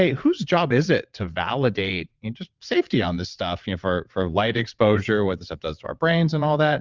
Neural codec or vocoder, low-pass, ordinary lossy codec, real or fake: vocoder, 22.05 kHz, 80 mel bands, WaveNeXt; 7.2 kHz; Opus, 24 kbps; fake